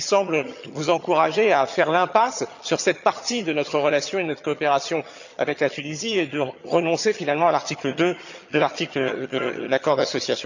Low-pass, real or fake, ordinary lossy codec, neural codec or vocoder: 7.2 kHz; fake; none; vocoder, 22.05 kHz, 80 mel bands, HiFi-GAN